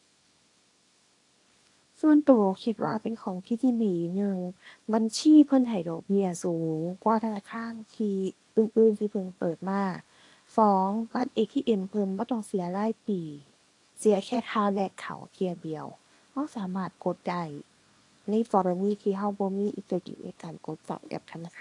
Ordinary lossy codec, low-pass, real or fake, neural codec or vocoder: AAC, 48 kbps; 10.8 kHz; fake; codec, 24 kHz, 0.9 kbps, WavTokenizer, small release